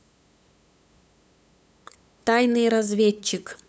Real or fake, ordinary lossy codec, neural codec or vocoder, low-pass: fake; none; codec, 16 kHz, 8 kbps, FunCodec, trained on LibriTTS, 25 frames a second; none